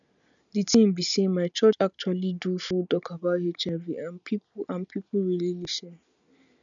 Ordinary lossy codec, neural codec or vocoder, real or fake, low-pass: none; none; real; 7.2 kHz